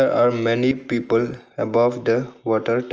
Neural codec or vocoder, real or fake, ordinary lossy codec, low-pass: none; real; Opus, 24 kbps; 7.2 kHz